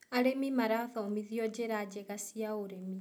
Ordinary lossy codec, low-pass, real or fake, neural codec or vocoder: none; none; real; none